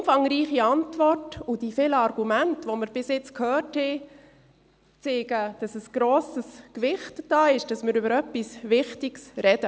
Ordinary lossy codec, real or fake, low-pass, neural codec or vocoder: none; real; none; none